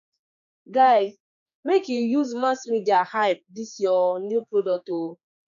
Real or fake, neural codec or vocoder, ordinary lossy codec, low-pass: fake; codec, 16 kHz, 4 kbps, X-Codec, HuBERT features, trained on general audio; none; 7.2 kHz